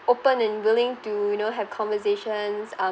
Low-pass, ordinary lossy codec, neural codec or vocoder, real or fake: none; none; none; real